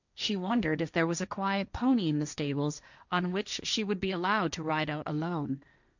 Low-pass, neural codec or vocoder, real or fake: 7.2 kHz; codec, 16 kHz, 1.1 kbps, Voila-Tokenizer; fake